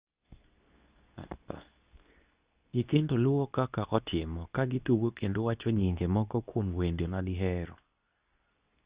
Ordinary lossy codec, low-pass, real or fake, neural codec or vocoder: Opus, 64 kbps; 3.6 kHz; fake; codec, 24 kHz, 0.9 kbps, WavTokenizer, medium speech release version 2